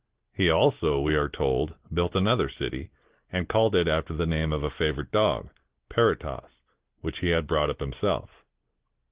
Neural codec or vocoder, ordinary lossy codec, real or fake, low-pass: none; Opus, 16 kbps; real; 3.6 kHz